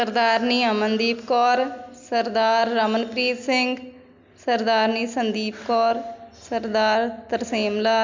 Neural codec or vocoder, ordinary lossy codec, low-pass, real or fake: none; MP3, 64 kbps; 7.2 kHz; real